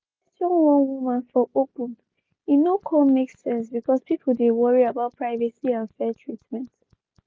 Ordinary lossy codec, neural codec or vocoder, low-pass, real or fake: none; none; none; real